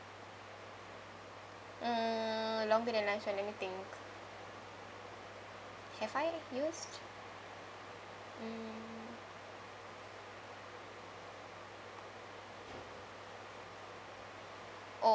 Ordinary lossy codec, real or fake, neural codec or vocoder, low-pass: none; real; none; none